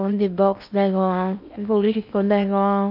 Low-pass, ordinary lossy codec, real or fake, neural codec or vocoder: 5.4 kHz; none; fake; codec, 16 kHz in and 24 kHz out, 0.6 kbps, FocalCodec, streaming, 4096 codes